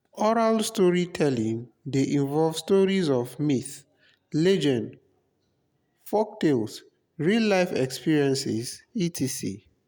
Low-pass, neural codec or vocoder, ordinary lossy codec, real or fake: none; none; none; real